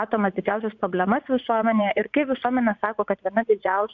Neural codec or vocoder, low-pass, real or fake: vocoder, 44.1 kHz, 80 mel bands, Vocos; 7.2 kHz; fake